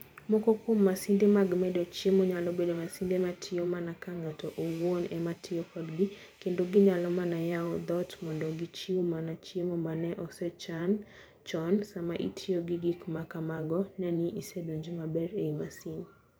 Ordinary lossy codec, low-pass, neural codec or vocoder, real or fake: none; none; vocoder, 44.1 kHz, 128 mel bands every 512 samples, BigVGAN v2; fake